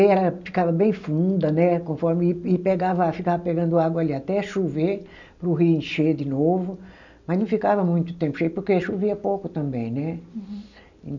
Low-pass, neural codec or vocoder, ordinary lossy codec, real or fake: 7.2 kHz; none; none; real